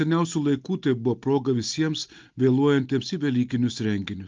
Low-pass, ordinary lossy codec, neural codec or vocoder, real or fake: 7.2 kHz; Opus, 24 kbps; none; real